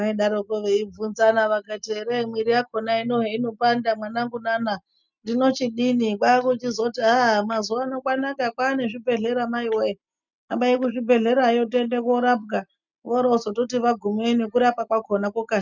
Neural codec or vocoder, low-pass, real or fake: none; 7.2 kHz; real